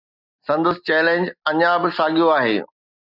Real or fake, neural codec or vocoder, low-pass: real; none; 5.4 kHz